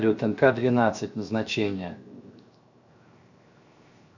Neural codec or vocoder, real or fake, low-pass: codec, 16 kHz, 0.7 kbps, FocalCodec; fake; 7.2 kHz